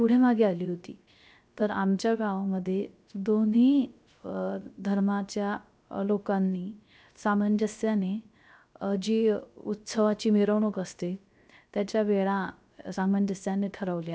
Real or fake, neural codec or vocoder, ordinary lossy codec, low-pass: fake; codec, 16 kHz, 0.3 kbps, FocalCodec; none; none